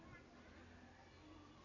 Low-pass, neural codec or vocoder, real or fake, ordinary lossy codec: 7.2 kHz; none; real; AAC, 48 kbps